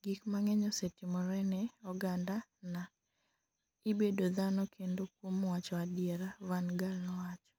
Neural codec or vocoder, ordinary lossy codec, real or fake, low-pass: none; none; real; none